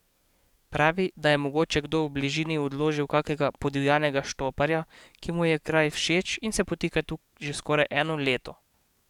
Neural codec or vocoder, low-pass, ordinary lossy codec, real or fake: codec, 44.1 kHz, 7.8 kbps, DAC; 19.8 kHz; none; fake